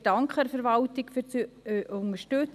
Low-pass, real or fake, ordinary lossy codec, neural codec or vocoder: 14.4 kHz; real; none; none